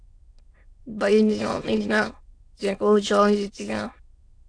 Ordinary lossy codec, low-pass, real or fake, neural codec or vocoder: AAC, 48 kbps; 9.9 kHz; fake; autoencoder, 22.05 kHz, a latent of 192 numbers a frame, VITS, trained on many speakers